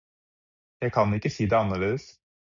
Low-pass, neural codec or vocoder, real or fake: 7.2 kHz; none; real